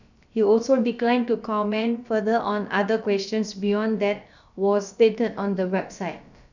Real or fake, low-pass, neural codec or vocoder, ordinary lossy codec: fake; 7.2 kHz; codec, 16 kHz, about 1 kbps, DyCAST, with the encoder's durations; none